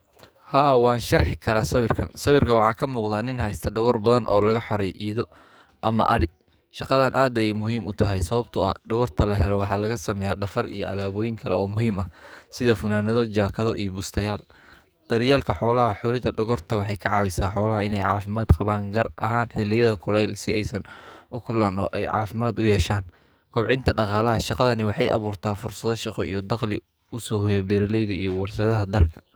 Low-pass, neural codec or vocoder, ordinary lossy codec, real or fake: none; codec, 44.1 kHz, 2.6 kbps, SNAC; none; fake